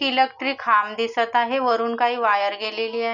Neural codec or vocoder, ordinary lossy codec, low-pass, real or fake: none; none; 7.2 kHz; real